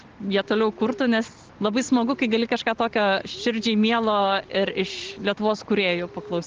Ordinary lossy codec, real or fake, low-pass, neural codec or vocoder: Opus, 16 kbps; real; 7.2 kHz; none